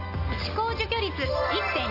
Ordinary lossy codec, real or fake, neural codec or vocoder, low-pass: AAC, 32 kbps; real; none; 5.4 kHz